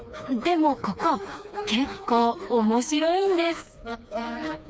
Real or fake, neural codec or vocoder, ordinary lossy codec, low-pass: fake; codec, 16 kHz, 2 kbps, FreqCodec, smaller model; none; none